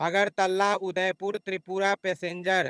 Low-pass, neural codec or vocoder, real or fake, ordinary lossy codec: none; vocoder, 22.05 kHz, 80 mel bands, HiFi-GAN; fake; none